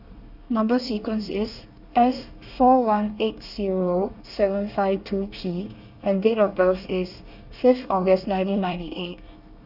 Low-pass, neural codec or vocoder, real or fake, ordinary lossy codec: 5.4 kHz; codec, 24 kHz, 1 kbps, SNAC; fake; none